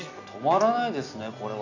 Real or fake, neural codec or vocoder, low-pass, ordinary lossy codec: real; none; 7.2 kHz; none